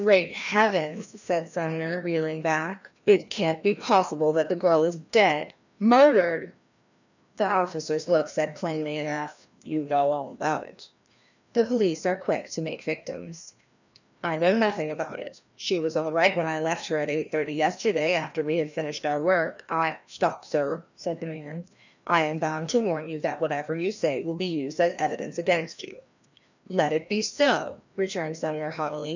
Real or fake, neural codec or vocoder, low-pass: fake; codec, 16 kHz, 1 kbps, FreqCodec, larger model; 7.2 kHz